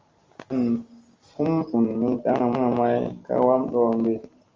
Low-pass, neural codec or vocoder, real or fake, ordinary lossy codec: 7.2 kHz; none; real; Opus, 32 kbps